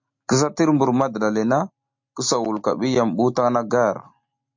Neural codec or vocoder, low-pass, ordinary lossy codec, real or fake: none; 7.2 kHz; MP3, 48 kbps; real